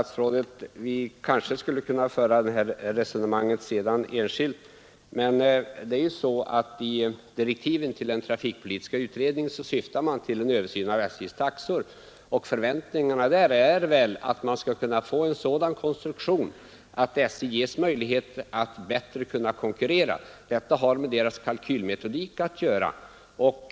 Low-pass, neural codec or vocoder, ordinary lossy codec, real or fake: none; none; none; real